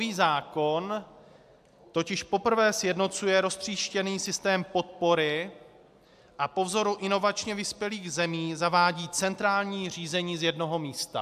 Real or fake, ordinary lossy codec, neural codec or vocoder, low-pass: real; AAC, 96 kbps; none; 14.4 kHz